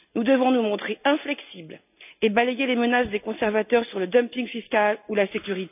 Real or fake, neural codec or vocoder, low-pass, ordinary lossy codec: real; none; 3.6 kHz; none